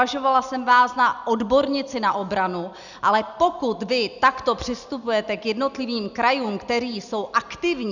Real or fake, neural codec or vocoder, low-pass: real; none; 7.2 kHz